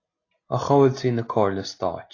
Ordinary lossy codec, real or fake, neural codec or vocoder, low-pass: AAC, 32 kbps; real; none; 7.2 kHz